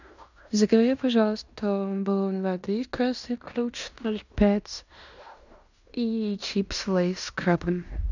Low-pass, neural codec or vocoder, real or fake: 7.2 kHz; codec, 16 kHz in and 24 kHz out, 0.9 kbps, LongCat-Audio-Codec, fine tuned four codebook decoder; fake